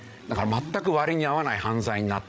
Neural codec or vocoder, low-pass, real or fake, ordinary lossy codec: codec, 16 kHz, 16 kbps, FreqCodec, larger model; none; fake; none